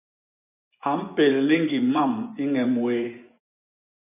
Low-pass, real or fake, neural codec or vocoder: 3.6 kHz; fake; vocoder, 24 kHz, 100 mel bands, Vocos